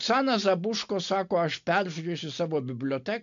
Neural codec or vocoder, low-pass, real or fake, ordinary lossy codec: none; 7.2 kHz; real; MP3, 64 kbps